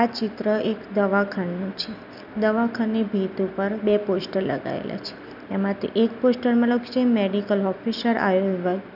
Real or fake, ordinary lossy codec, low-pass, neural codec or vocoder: real; none; 5.4 kHz; none